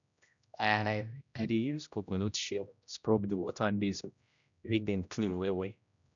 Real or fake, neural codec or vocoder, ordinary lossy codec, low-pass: fake; codec, 16 kHz, 0.5 kbps, X-Codec, HuBERT features, trained on general audio; none; 7.2 kHz